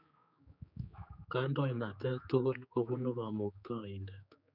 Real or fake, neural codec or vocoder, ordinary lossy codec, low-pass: fake; codec, 16 kHz, 4 kbps, X-Codec, HuBERT features, trained on general audio; none; 5.4 kHz